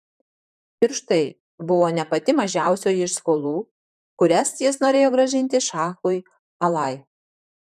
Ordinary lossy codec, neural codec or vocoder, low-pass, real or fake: MP3, 96 kbps; vocoder, 44.1 kHz, 128 mel bands, Pupu-Vocoder; 14.4 kHz; fake